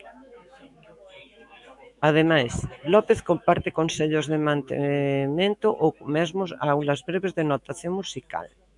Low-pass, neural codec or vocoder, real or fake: 10.8 kHz; autoencoder, 48 kHz, 128 numbers a frame, DAC-VAE, trained on Japanese speech; fake